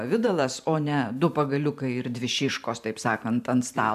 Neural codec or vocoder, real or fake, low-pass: vocoder, 44.1 kHz, 128 mel bands every 512 samples, BigVGAN v2; fake; 14.4 kHz